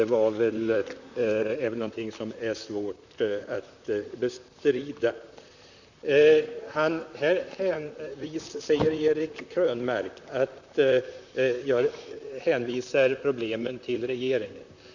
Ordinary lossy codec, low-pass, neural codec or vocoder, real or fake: none; 7.2 kHz; vocoder, 44.1 kHz, 128 mel bands, Pupu-Vocoder; fake